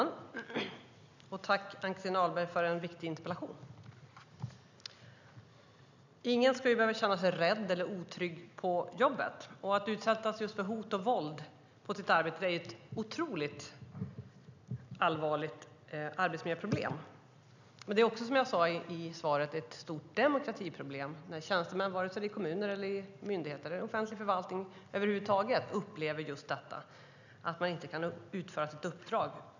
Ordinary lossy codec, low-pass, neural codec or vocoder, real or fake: none; 7.2 kHz; none; real